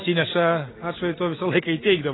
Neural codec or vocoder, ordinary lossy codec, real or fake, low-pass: none; AAC, 16 kbps; real; 7.2 kHz